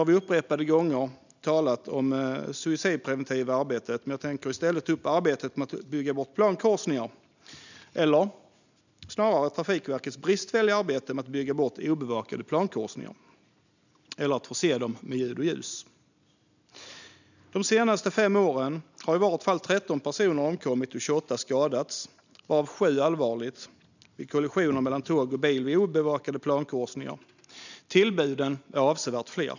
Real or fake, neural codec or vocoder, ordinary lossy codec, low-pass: real; none; none; 7.2 kHz